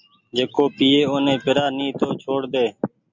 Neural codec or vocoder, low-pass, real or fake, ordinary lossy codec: none; 7.2 kHz; real; MP3, 64 kbps